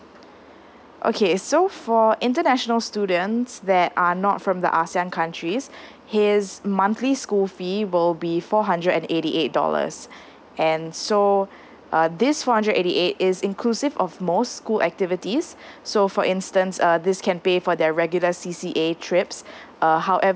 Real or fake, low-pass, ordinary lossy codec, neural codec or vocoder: real; none; none; none